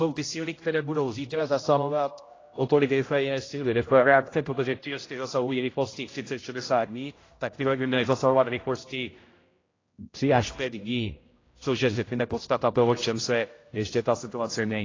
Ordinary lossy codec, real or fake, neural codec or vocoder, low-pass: AAC, 32 kbps; fake; codec, 16 kHz, 0.5 kbps, X-Codec, HuBERT features, trained on general audio; 7.2 kHz